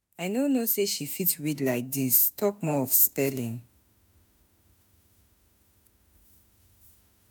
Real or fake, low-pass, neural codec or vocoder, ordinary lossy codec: fake; none; autoencoder, 48 kHz, 32 numbers a frame, DAC-VAE, trained on Japanese speech; none